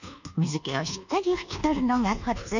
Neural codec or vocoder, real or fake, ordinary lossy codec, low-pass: codec, 24 kHz, 1.2 kbps, DualCodec; fake; none; 7.2 kHz